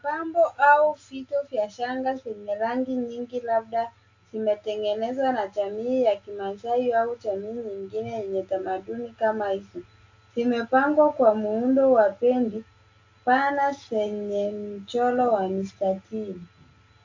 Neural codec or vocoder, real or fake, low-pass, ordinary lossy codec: none; real; 7.2 kHz; AAC, 48 kbps